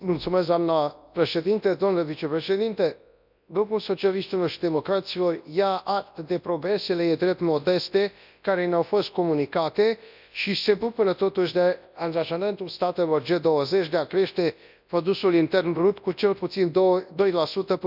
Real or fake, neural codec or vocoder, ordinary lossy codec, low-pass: fake; codec, 24 kHz, 0.9 kbps, WavTokenizer, large speech release; none; 5.4 kHz